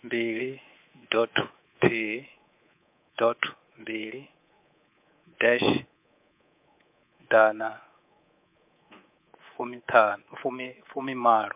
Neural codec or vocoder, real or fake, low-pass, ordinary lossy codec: codec, 24 kHz, 3.1 kbps, DualCodec; fake; 3.6 kHz; MP3, 32 kbps